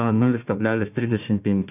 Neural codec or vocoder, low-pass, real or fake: codec, 16 kHz, 1 kbps, FunCodec, trained on Chinese and English, 50 frames a second; 3.6 kHz; fake